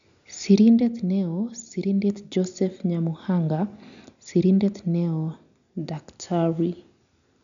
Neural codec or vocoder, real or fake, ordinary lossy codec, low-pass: none; real; none; 7.2 kHz